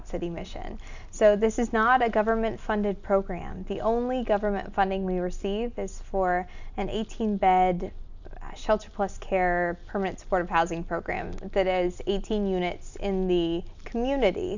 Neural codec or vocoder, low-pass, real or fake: none; 7.2 kHz; real